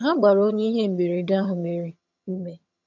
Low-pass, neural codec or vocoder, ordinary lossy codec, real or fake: 7.2 kHz; vocoder, 22.05 kHz, 80 mel bands, HiFi-GAN; none; fake